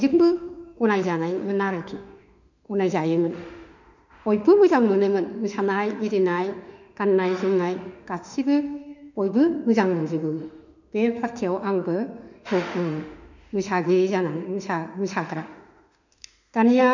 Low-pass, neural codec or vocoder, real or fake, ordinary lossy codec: 7.2 kHz; autoencoder, 48 kHz, 32 numbers a frame, DAC-VAE, trained on Japanese speech; fake; none